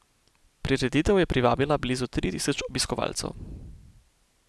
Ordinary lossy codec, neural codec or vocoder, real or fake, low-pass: none; none; real; none